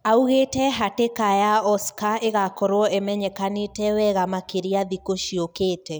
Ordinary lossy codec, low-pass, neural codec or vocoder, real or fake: none; none; none; real